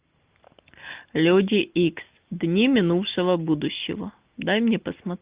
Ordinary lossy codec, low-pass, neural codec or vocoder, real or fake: Opus, 32 kbps; 3.6 kHz; none; real